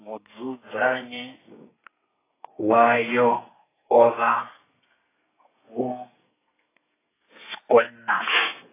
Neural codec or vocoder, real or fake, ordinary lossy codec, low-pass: codec, 32 kHz, 1.9 kbps, SNAC; fake; AAC, 16 kbps; 3.6 kHz